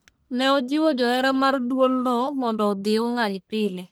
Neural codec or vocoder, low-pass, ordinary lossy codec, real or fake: codec, 44.1 kHz, 1.7 kbps, Pupu-Codec; none; none; fake